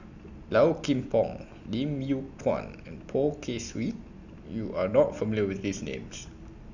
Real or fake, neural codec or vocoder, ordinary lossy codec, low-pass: real; none; none; 7.2 kHz